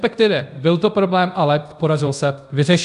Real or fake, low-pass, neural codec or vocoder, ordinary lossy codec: fake; 10.8 kHz; codec, 24 kHz, 0.5 kbps, DualCodec; AAC, 96 kbps